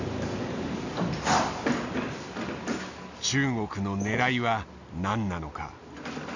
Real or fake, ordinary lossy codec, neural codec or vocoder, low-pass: real; none; none; 7.2 kHz